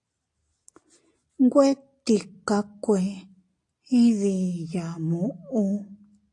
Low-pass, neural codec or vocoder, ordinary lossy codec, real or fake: 10.8 kHz; none; AAC, 48 kbps; real